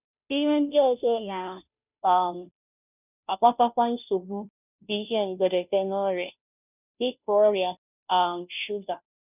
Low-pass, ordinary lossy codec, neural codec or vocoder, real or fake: 3.6 kHz; none; codec, 16 kHz, 0.5 kbps, FunCodec, trained on Chinese and English, 25 frames a second; fake